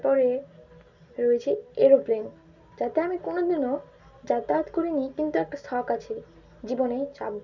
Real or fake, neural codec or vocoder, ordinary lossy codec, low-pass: real; none; none; 7.2 kHz